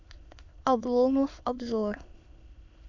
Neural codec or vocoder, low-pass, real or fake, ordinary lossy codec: autoencoder, 22.05 kHz, a latent of 192 numbers a frame, VITS, trained on many speakers; 7.2 kHz; fake; AAC, 48 kbps